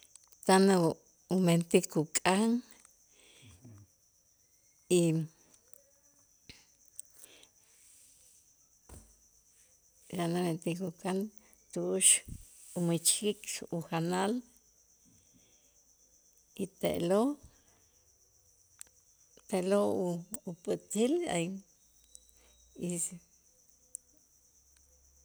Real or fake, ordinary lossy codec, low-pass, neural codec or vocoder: real; none; none; none